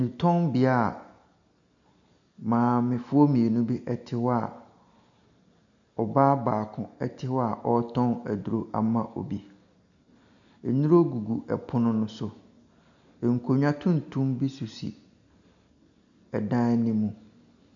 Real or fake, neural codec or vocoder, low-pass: real; none; 7.2 kHz